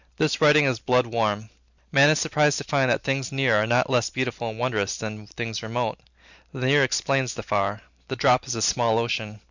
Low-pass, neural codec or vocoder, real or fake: 7.2 kHz; none; real